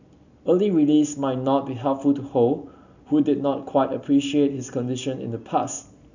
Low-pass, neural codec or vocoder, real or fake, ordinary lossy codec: 7.2 kHz; none; real; none